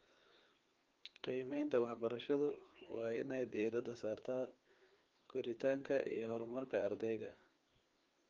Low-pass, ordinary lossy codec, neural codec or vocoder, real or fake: 7.2 kHz; Opus, 32 kbps; codec, 16 kHz, 2 kbps, FreqCodec, larger model; fake